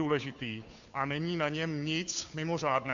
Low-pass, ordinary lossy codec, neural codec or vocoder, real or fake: 7.2 kHz; AAC, 64 kbps; codec, 16 kHz, 2 kbps, FunCodec, trained on Chinese and English, 25 frames a second; fake